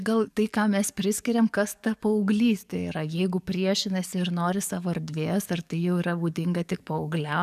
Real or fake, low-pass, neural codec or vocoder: fake; 14.4 kHz; codec, 44.1 kHz, 7.8 kbps, DAC